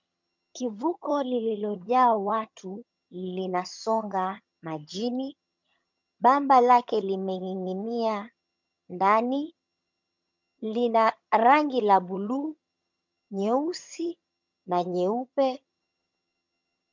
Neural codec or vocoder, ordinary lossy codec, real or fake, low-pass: vocoder, 22.05 kHz, 80 mel bands, HiFi-GAN; MP3, 64 kbps; fake; 7.2 kHz